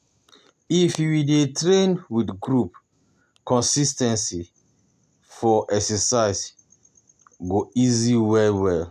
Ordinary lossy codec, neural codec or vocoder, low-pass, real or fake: none; none; 14.4 kHz; real